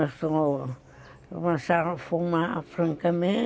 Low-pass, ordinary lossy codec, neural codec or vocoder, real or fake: none; none; none; real